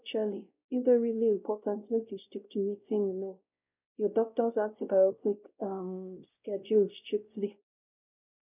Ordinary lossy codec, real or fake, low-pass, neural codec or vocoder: none; fake; 3.6 kHz; codec, 16 kHz, 0.5 kbps, X-Codec, WavLM features, trained on Multilingual LibriSpeech